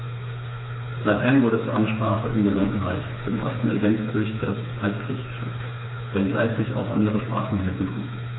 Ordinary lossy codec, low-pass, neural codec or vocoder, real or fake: AAC, 16 kbps; 7.2 kHz; codec, 16 kHz, 4 kbps, FreqCodec, smaller model; fake